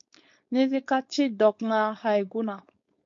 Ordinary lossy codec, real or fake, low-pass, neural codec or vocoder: MP3, 48 kbps; fake; 7.2 kHz; codec, 16 kHz, 4.8 kbps, FACodec